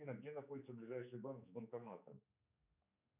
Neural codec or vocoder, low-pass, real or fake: codec, 16 kHz, 4 kbps, X-Codec, HuBERT features, trained on general audio; 3.6 kHz; fake